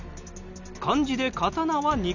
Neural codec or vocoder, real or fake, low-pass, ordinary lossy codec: none; real; 7.2 kHz; none